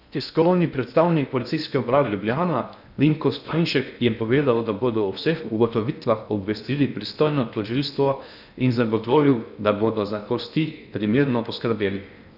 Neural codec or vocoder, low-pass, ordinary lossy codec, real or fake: codec, 16 kHz in and 24 kHz out, 0.6 kbps, FocalCodec, streaming, 2048 codes; 5.4 kHz; none; fake